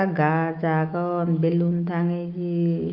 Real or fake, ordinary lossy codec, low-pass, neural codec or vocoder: fake; Opus, 32 kbps; 5.4 kHz; autoencoder, 48 kHz, 128 numbers a frame, DAC-VAE, trained on Japanese speech